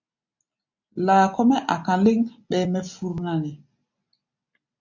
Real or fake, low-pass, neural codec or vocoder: real; 7.2 kHz; none